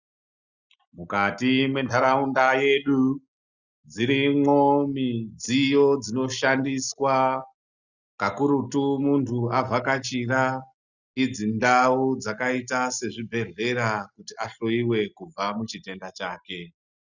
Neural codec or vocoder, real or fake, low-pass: none; real; 7.2 kHz